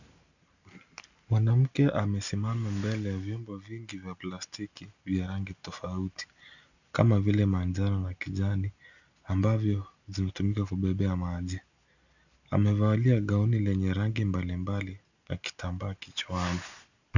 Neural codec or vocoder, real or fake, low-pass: none; real; 7.2 kHz